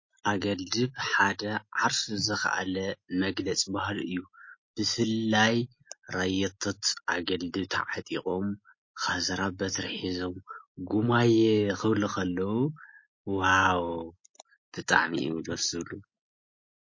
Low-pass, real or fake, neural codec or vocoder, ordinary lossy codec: 7.2 kHz; real; none; MP3, 32 kbps